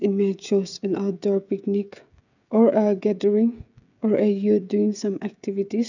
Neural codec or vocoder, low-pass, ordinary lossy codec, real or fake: codec, 16 kHz, 16 kbps, FreqCodec, smaller model; 7.2 kHz; none; fake